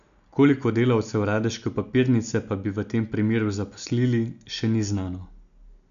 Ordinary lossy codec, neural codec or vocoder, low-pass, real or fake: none; none; 7.2 kHz; real